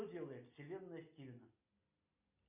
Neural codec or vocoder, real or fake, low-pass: none; real; 3.6 kHz